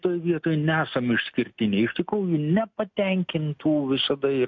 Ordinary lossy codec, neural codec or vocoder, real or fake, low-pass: MP3, 48 kbps; none; real; 7.2 kHz